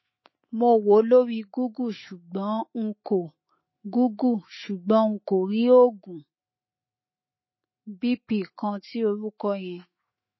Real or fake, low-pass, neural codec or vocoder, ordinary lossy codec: fake; 7.2 kHz; autoencoder, 48 kHz, 128 numbers a frame, DAC-VAE, trained on Japanese speech; MP3, 24 kbps